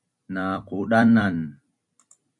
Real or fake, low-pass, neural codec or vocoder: fake; 10.8 kHz; vocoder, 44.1 kHz, 128 mel bands every 256 samples, BigVGAN v2